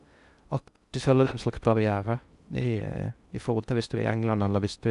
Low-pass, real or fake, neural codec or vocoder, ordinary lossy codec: 10.8 kHz; fake; codec, 16 kHz in and 24 kHz out, 0.6 kbps, FocalCodec, streaming, 2048 codes; none